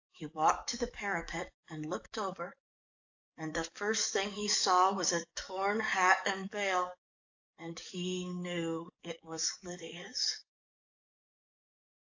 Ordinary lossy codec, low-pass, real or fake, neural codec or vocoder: AAC, 48 kbps; 7.2 kHz; fake; codec, 44.1 kHz, 7.8 kbps, DAC